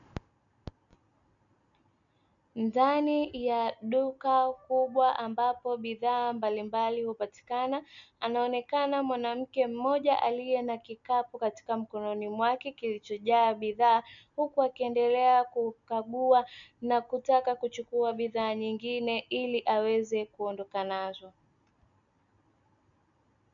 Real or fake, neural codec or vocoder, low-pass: real; none; 7.2 kHz